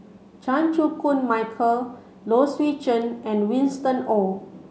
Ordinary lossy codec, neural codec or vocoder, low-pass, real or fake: none; none; none; real